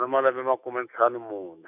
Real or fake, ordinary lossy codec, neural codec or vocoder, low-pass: fake; none; autoencoder, 48 kHz, 128 numbers a frame, DAC-VAE, trained on Japanese speech; 3.6 kHz